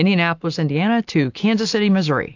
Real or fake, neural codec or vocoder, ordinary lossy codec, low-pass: real; none; AAC, 48 kbps; 7.2 kHz